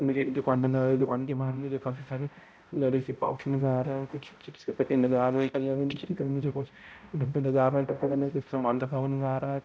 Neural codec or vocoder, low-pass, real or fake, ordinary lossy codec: codec, 16 kHz, 0.5 kbps, X-Codec, HuBERT features, trained on balanced general audio; none; fake; none